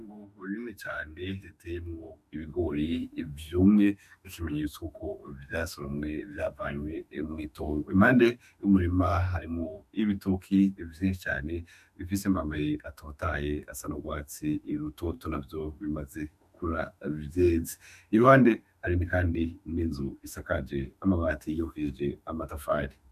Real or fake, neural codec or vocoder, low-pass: fake; autoencoder, 48 kHz, 32 numbers a frame, DAC-VAE, trained on Japanese speech; 14.4 kHz